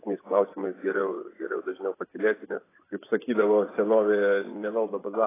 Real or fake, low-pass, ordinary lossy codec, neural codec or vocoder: fake; 3.6 kHz; AAC, 16 kbps; vocoder, 24 kHz, 100 mel bands, Vocos